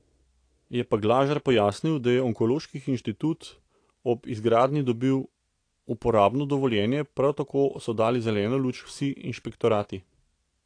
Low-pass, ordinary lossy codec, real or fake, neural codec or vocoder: 9.9 kHz; AAC, 48 kbps; real; none